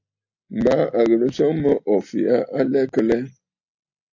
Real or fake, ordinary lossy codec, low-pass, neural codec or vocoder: real; AAC, 48 kbps; 7.2 kHz; none